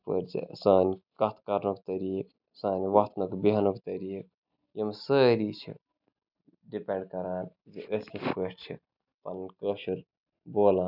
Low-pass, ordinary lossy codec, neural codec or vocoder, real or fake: 5.4 kHz; none; none; real